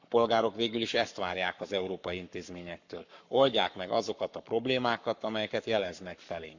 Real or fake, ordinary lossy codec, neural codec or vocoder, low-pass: fake; none; codec, 44.1 kHz, 7.8 kbps, Pupu-Codec; 7.2 kHz